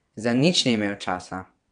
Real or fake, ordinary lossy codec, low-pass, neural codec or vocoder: fake; none; 9.9 kHz; vocoder, 22.05 kHz, 80 mel bands, WaveNeXt